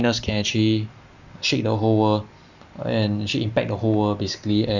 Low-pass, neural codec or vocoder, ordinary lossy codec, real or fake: 7.2 kHz; none; none; real